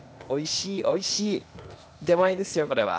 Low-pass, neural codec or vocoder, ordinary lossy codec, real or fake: none; codec, 16 kHz, 0.8 kbps, ZipCodec; none; fake